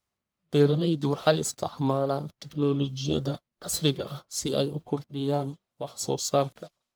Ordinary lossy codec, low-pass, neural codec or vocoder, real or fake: none; none; codec, 44.1 kHz, 1.7 kbps, Pupu-Codec; fake